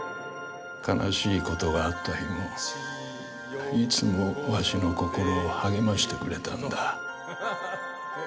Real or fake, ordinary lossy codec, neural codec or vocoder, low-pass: real; none; none; none